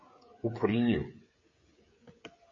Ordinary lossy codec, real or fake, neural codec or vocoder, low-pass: MP3, 32 kbps; fake; codec, 16 kHz, 16 kbps, FreqCodec, smaller model; 7.2 kHz